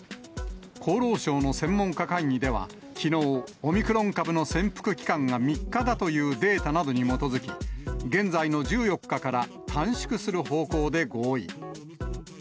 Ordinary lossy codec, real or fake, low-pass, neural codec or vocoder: none; real; none; none